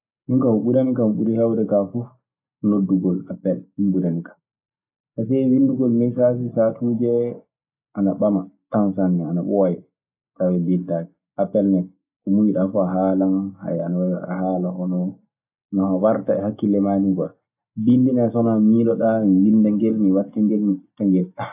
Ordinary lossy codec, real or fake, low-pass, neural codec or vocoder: AAC, 32 kbps; real; 3.6 kHz; none